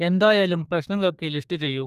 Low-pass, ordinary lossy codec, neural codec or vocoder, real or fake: 14.4 kHz; none; codec, 32 kHz, 1.9 kbps, SNAC; fake